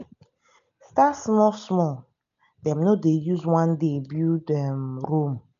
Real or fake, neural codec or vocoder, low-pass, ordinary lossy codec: real; none; 7.2 kHz; none